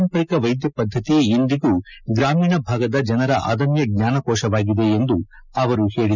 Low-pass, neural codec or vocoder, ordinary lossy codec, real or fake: 7.2 kHz; none; none; real